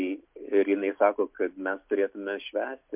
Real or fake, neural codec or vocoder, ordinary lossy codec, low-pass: real; none; MP3, 32 kbps; 3.6 kHz